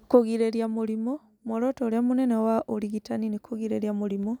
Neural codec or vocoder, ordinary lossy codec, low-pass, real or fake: none; none; 19.8 kHz; real